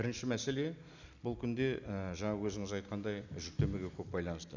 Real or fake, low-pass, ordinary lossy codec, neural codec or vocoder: real; 7.2 kHz; none; none